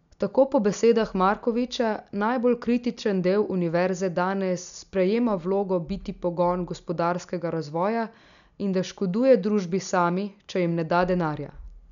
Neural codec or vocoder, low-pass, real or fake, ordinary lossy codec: none; 7.2 kHz; real; none